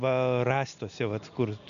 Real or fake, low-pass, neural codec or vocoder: real; 7.2 kHz; none